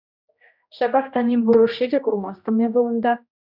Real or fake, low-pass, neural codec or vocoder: fake; 5.4 kHz; codec, 16 kHz, 1 kbps, X-Codec, HuBERT features, trained on general audio